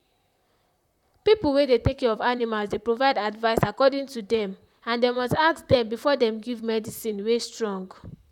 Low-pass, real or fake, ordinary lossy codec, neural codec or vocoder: 19.8 kHz; fake; none; vocoder, 44.1 kHz, 128 mel bands, Pupu-Vocoder